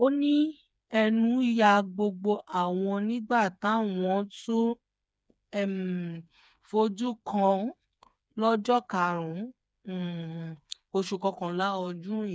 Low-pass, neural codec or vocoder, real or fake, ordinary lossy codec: none; codec, 16 kHz, 4 kbps, FreqCodec, smaller model; fake; none